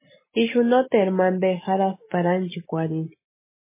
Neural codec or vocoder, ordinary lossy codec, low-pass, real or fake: none; MP3, 16 kbps; 3.6 kHz; real